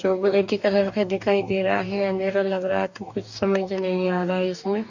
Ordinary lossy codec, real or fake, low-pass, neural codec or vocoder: none; fake; 7.2 kHz; codec, 44.1 kHz, 2.6 kbps, DAC